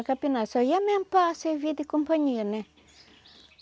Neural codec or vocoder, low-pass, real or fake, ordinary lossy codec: none; none; real; none